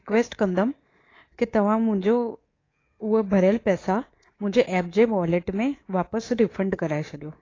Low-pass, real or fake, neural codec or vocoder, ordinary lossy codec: 7.2 kHz; fake; codec, 24 kHz, 3.1 kbps, DualCodec; AAC, 32 kbps